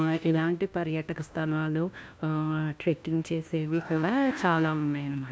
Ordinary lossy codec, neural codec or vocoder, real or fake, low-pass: none; codec, 16 kHz, 1 kbps, FunCodec, trained on LibriTTS, 50 frames a second; fake; none